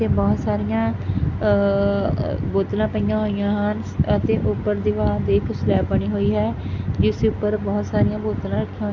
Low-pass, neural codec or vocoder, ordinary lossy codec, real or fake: 7.2 kHz; none; none; real